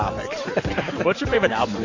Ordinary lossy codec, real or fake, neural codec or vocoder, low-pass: AAC, 48 kbps; fake; vocoder, 44.1 kHz, 128 mel bands every 512 samples, BigVGAN v2; 7.2 kHz